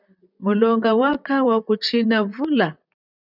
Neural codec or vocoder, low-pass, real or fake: vocoder, 44.1 kHz, 128 mel bands, Pupu-Vocoder; 5.4 kHz; fake